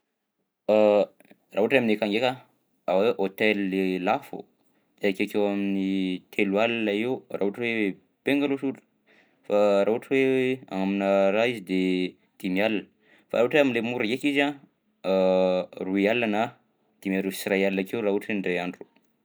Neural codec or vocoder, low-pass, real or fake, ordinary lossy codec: none; none; real; none